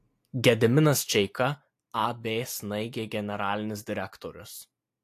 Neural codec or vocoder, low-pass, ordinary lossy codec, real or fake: none; 14.4 kHz; AAC, 64 kbps; real